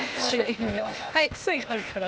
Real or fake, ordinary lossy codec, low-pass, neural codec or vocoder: fake; none; none; codec, 16 kHz, 0.8 kbps, ZipCodec